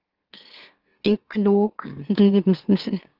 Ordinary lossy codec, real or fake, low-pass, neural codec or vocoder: Opus, 16 kbps; fake; 5.4 kHz; autoencoder, 44.1 kHz, a latent of 192 numbers a frame, MeloTTS